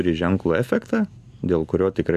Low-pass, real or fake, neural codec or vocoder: 14.4 kHz; real; none